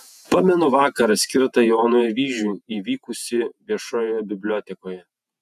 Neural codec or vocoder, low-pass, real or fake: vocoder, 48 kHz, 128 mel bands, Vocos; 14.4 kHz; fake